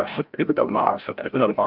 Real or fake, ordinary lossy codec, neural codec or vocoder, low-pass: fake; Opus, 32 kbps; codec, 16 kHz, 0.5 kbps, FreqCodec, larger model; 5.4 kHz